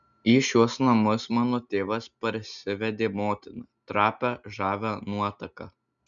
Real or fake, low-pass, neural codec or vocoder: real; 7.2 kHz; none